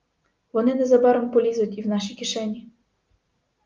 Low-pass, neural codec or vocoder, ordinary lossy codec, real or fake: 7.2 kHz; none; Opus, 32 kbps; real